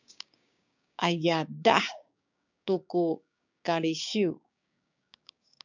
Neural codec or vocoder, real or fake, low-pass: codec, 16 kHz, 6 kbps, DAC; fake; 7.2 kHz